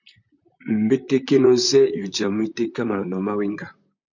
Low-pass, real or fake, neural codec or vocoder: 7.2 kHz; fake; vocoder, 44.1 kHz, 128 mel bands, Pupu-Vocoder